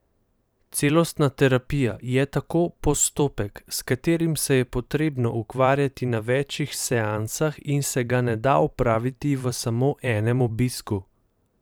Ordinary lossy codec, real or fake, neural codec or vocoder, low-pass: none; fake; vocoder, 44.1 kHz, 128 mel bands every 512 samples, BigVGAN v2; none